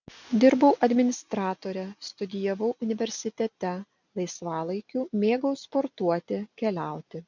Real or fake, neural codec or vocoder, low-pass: real; none; 7.2 kHz